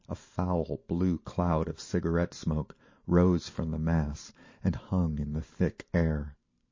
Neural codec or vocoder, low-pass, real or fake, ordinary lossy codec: vocoder, 44.1 kHz, 128 mel bands every 512 samples, BigVGAN v2; 7.2 kHz; fake; MP3, 32 kbps